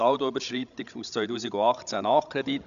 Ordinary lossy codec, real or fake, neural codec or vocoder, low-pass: none; fake; codec, 16 kHz, 16 kbps, FreqCodec, larger model; 7.2 kHz